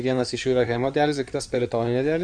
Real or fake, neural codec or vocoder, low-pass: fake; codec, 16 kHz in and 24 kHz out, 2.2 kbps, FireRedTTS-2 codec; 9.9 kHz